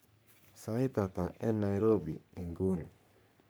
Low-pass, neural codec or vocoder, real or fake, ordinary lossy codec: none; codec, 44.1 kHz, 3.4 kbps, Pupu-Codec; fake; none